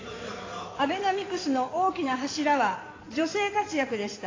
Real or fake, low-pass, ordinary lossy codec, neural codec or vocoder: fake; 7.2 kHz; AAC, 32 kbps; codec, 16 kHz in and 24 kHz out, 2.2 kbps, FireRedTTS-2 codec